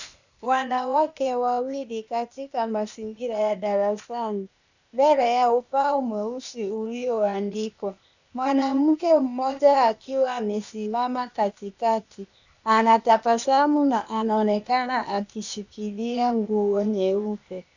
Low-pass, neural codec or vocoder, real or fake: 7.2 kHz; codec, 16 kHz, 0.8 kbps, ZipCodec; fake